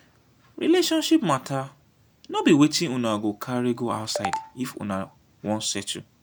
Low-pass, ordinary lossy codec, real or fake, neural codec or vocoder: none; none; real; none